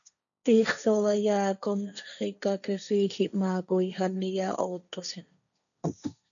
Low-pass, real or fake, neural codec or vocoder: 7.2 kHz; fake; codec, 16 kHz, 1.1 kbps, Voila-Tokenizer